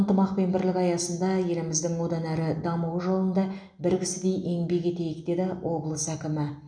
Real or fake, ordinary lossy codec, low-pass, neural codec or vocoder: real; none; 9.9 kHz; none